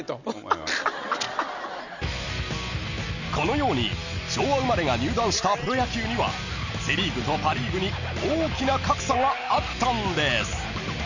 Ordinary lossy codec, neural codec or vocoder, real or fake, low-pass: none; none; real; 7.2 kHz